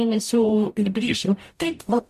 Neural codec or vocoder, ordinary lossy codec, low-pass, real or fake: codec, 44.1 kHz, 0.9 kbps, DAC; MP3, 64 kbps; 14.4 kHz; fake